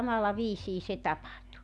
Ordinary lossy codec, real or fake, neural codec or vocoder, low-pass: none; real; none; none